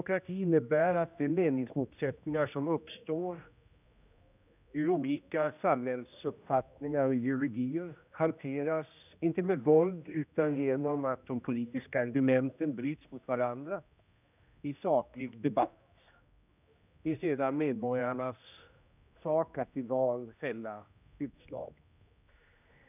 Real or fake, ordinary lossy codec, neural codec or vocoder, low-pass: fake; none; codec, 16 kHz, 1 kbps, X-Codec, HuBERT features, trained on general audio; 3.6 kHz